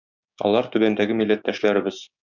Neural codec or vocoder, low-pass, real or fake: codec, 16 kHz, 6 kbps, DAC; 7.2 kHz; fake